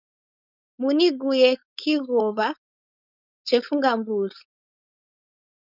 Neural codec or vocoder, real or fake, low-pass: codec, 16 kHz, 4.8 kbps, FACodec; fake; 5.4 kHz